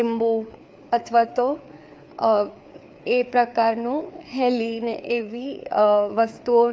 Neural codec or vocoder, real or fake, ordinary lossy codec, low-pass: codec, 16 kHz, 4 kbps, FreqCodec, larger model; fake; none; none